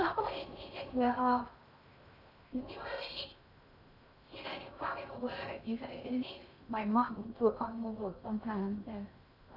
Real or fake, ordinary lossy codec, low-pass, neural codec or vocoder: fake; none; 5.4 kHz; codec, 16 kHz in and 24 kHz out, 0.6 kbps, FocalCodec, streaming, 4096 codes